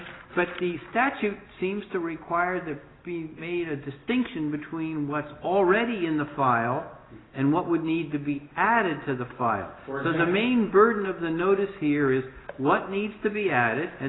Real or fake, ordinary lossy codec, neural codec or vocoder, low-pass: real; AAC, 16 kbps; none; 7.2 kHz